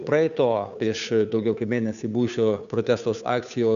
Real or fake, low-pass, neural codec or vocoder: fake; 7.2 kHz; codec, 16 kHz, 2 kbps, FunCodec, trained on Chinese and English, 25 frames a second